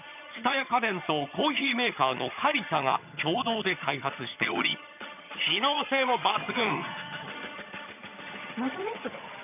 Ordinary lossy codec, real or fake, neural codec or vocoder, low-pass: none; fake; vocoder, 22.05 kHz, 80 mel bands, HiFi-GAN; 3.6 kHz